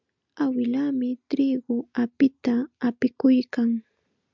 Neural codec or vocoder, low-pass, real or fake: none; 7.2 kHz; real